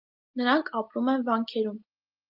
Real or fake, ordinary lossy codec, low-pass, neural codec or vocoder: real; Opus, 32 kbps; 5.4 kHz; none